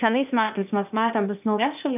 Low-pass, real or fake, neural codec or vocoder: 3.6 kHz; fake; codec, 16 kHz, 0.8 kbps, ZipCodec